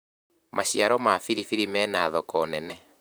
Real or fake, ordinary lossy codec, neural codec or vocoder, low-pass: fake; none; vocoder, 44.1 kHz, 128 mel bands, Pupu-Vocoder; none